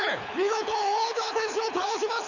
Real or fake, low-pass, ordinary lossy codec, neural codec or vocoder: fake; 7.2 kHz; none; codec, 24 kHz, 6 kbps, HILCodec